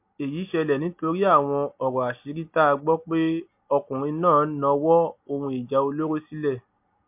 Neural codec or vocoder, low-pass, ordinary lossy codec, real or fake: none; 3.6 kHz; MP3, 32 kbps; real